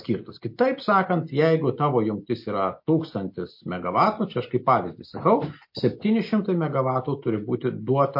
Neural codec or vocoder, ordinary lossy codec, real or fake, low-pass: none; MP3, 32 kbps; real; 5.4 kHz